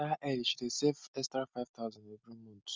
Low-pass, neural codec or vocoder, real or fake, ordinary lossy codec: none; none; real; none